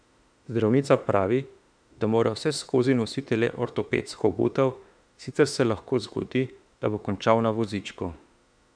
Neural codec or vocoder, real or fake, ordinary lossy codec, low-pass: autoencoder, 48 kHz, 32 numbers a frame, DAC-VAE, trained on Japanese speech; fake; none; 9.9 kHz